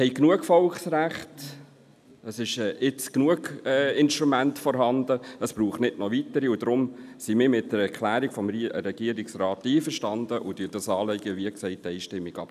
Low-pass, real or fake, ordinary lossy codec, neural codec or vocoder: 14.4 kHz; real; none; none